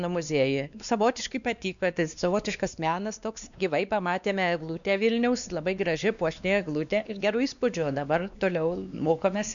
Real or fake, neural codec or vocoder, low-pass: fake; codec, 16 kHz, 2 kbps, X-Codec, WavLM features, trained on Multilingual LibriSpeech; 7.2 kHz